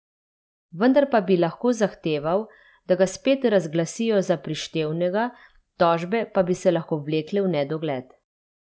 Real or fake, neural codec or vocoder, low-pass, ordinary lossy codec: real; none; none; none